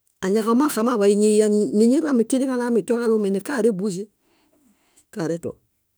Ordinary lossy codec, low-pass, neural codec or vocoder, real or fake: none; none; autoencoder, 48 kHz, 32 numbers a frame, DAC-VAE, trained on Japanese speech; fake